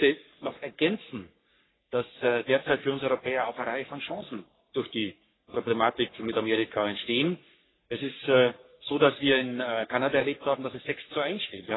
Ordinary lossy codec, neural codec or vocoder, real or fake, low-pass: AAC, 16 kbps; codec, 44.1 kHz, 3.4 kbps, Pupu-Codec; fake; 7.2 kHz